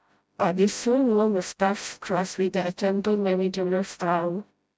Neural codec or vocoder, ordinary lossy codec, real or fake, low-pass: codec, 16 kHz, 0.5 kbps, FreqCodec, smaller model; none; fake; none